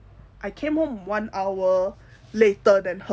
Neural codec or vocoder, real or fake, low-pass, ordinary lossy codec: none; real; none; none